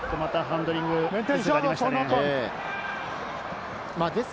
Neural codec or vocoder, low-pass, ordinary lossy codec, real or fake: none; none; none; real